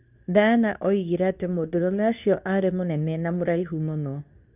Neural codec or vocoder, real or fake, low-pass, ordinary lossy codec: codec, 24 kHz, 0.9 kbps, WavTokenizer, medium speech release version 2; fake; 3.6 kHz; none